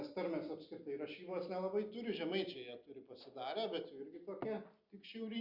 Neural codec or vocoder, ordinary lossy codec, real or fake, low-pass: none; Opus, 64 kbps; real; 5.4 kHz